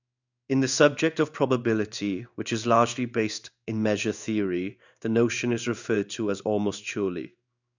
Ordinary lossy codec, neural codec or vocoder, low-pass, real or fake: none; codec, 16 kHz in and 24 kHz out, 1 kbps, XY-Tokenizer; 7.2 kHz; fake